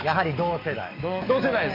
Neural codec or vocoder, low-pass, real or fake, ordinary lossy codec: codec, 44.1 kHz, 7.8 kbps, DAC; 5.4 kHz; fake; MP3, 48 kbps